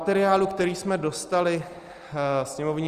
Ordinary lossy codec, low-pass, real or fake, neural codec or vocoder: Opus, 24 kbps; 14.4 kHz; real; none